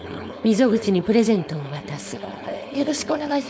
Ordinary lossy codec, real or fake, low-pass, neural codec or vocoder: none; fake; none; codec, 16 kHz, 4.8 kbps, FACodec